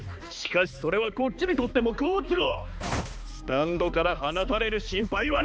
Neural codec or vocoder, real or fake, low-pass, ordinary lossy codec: codec, 16 kHz, 2 kbps, X-Codec, HuBERT features, trained on balanced general audio; fake; none; none